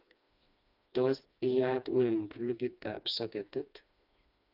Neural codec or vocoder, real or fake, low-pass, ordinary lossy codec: codec, 16 kHz, 2 kbps, FreqCodec, smaller model; fake; 5.4 kHz; none